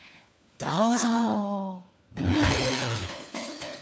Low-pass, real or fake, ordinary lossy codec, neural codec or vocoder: none; fake; none; codec, 16 kHz, 4 kbps, FunCodec, trained on LibriTTS, 50 frames a second